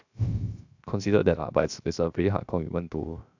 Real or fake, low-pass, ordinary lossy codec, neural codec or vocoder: fake; 7.2 kHz; none; codec, 16 kHz, 0.7 kbps, FocalCodec